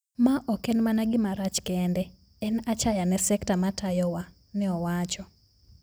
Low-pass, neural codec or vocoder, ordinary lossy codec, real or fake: none; none; none; real